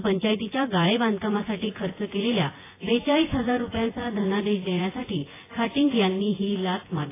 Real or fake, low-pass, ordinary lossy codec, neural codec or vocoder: fake; 3.6 kHz; AAC, 16 kbps; vocoder, 24 kHz, 100 mel bands, Vocos